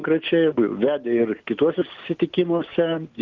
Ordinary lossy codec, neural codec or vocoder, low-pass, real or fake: Opus, 32 kbps; none; 7.2 kHz; real